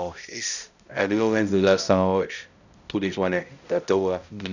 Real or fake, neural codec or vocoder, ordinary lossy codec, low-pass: fake; codec, 16 kHz, 0.5 kbps, X-Codec, HuBERT features, trained on balanced general audio; none; 7.2 kHz